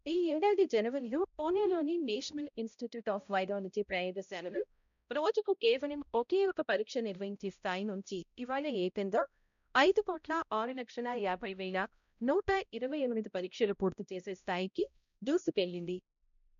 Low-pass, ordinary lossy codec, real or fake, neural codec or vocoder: 7.2 kHz; none; fake; codec, 16 kHz, 0.5 kbps, X-Codec, HuBERT features, trained on balanced general audio